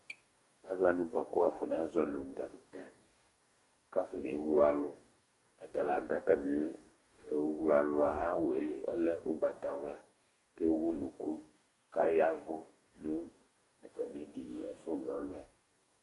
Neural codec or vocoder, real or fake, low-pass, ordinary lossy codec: codec, 44.1 kHz, 2.6 kbps, DAC; fake; 14.4 kHz; MP3, 48 kbps